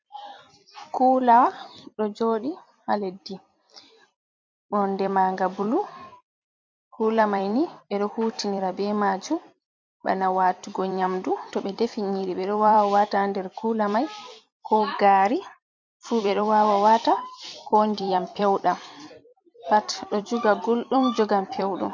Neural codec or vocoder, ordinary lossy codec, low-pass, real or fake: vocoder, 44.1 kHz, 128 mel bands every 256 samples, BigVGAN v2; MP3, 48 kbps; 7.2 kHz; fake